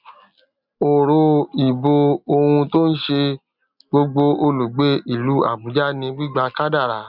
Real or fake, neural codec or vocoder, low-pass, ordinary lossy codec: real; none; 5.4 kHz; none